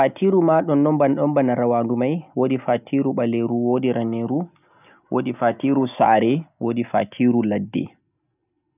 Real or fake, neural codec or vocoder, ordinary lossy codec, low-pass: real; none; none; 3.6 kHz